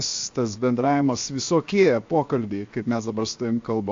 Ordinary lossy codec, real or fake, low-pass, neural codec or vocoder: AAC, 48 kbps; fake; 7.2 kHz; codec, 16 kHz, 0.7 kbps, FocalCodec